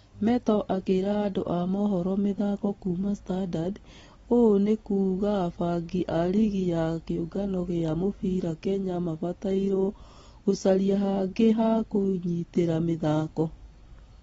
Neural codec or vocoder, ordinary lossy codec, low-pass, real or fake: none; AAC, 24 kbps; 10.8 kHz; real